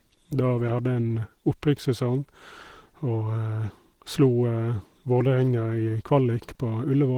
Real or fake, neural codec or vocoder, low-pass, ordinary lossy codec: real; none; 19.8 kHz; Opus, 16 kbps